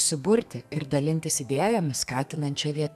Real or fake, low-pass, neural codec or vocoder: fake; 14.4 kHz; codec, 32 kHz, 1.9 kbps, SNAC